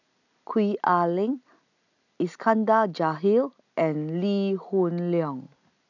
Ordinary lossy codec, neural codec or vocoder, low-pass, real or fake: none; none; 7.2 kHz; real